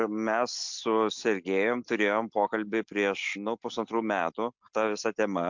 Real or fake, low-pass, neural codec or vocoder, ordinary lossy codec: real; 7.2 kHz; none; MP3, 64 kbps